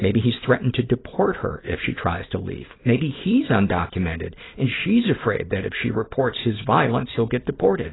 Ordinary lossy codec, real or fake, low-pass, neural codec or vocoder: AAC, 16 kbps; fake; 7.2 kHz; vocoder, 44.1 kHz, 128 mel bands every 256 samples, BigVGAN v2